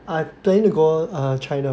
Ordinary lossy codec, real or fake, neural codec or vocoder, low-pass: none; real; none; none